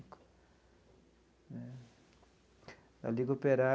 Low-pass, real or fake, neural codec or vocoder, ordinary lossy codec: none; real; none; none